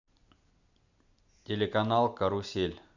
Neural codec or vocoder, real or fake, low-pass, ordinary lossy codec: none; real; 7.2 kHz; none